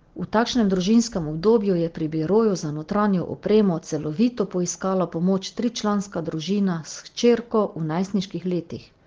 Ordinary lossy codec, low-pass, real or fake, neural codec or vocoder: Opus, 16 kbps; 7.2 kHz; real; none